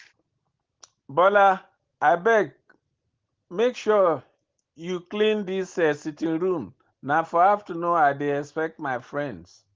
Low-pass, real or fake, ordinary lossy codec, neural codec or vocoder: 7.2 kHz; real; Opus, 16 kbps; none